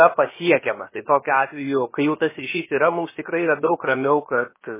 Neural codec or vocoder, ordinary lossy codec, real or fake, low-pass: codec, 16 kHz, about 1 kbps, DyCAST, with the encoder's durations; MP3, 16 kbps; fake; 3.6 kHz